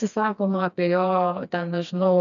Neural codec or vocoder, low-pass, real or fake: codec, 16 kHz, 2 kbps, FreqCodec, smaller model; 7.2 kHz; fake